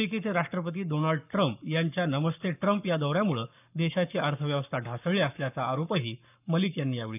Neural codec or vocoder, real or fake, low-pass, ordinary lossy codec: codec, 44.1 kHz, 7.8 kbps, Pupu-Codec; fake; 3.6 kHz; none